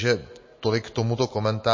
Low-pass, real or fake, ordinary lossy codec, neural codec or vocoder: 7.2 kHz; real; MP3, 32 kbps; none